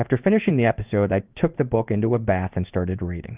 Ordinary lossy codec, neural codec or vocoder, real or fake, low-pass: Opus, 24 kbps; codec, 16 kHz in and 24 kHz out, 1 kbps, XY-Tokenizer; fake; 3.6 kHz